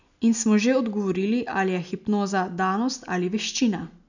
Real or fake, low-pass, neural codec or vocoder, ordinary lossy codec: real; 7.2 kHz; none; none